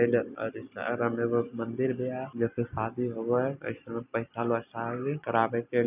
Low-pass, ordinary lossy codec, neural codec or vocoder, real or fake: 3.6 kHz; none; none; real